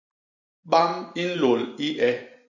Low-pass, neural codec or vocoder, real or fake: 7.2 kHz; vocoder, 44.1 kHz, 128 mel bands every 512 samples, BigVGAN v2; fake